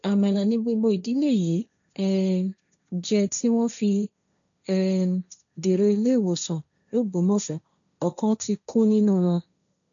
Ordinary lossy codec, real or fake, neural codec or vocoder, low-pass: MP3, 96 kbps; fake; codec, 16 kHz, 1.1 kbps, Voila-Tokenizer; 7.2 kHz